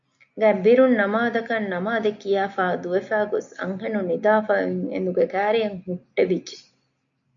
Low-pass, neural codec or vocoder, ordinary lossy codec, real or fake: 7.2 kHz; none; MP3, 64 kbps; real